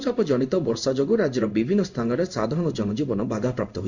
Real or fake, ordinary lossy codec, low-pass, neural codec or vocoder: fake; none; 7.2 kHz; codec, 16 kHz in and 24 kHz out, 1 kbps, XY-Tokenizer